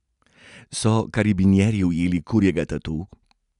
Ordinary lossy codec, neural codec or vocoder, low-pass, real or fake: none; none; 10.8 kHz; real